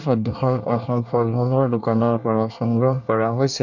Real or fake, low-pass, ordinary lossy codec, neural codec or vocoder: fake; 7.2 kHz; none; codec, 24 kHz, 1 kbps, SNAC